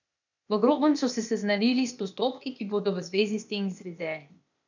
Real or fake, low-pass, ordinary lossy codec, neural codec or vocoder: fake; 7.2 kHz; none; codec, 16 kHz, 0.8 kbps, ZipCodec